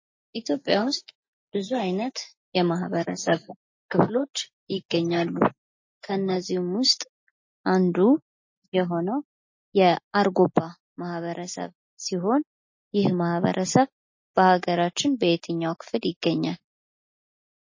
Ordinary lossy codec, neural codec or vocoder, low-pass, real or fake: MP3, 32 kbps; none; 7.2 kHz; real